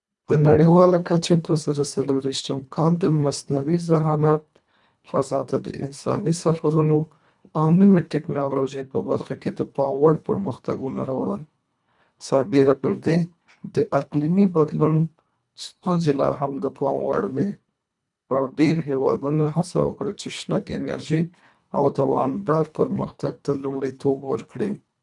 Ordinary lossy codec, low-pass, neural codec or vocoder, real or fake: none; 10.8 kHz; codec, 24 kHz, 1.5 kbps, HILCodec; fake